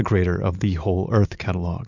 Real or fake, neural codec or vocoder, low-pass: real; none; 7.2 kHz